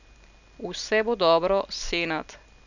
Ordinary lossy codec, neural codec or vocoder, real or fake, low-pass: none; none; real; 7.2 kHz